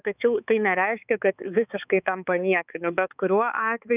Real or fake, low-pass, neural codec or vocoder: fake; 3.6 kHz; codec, 16 kHz, 4 kbps, X-Codec, HuBERT features, trained on balanced general audio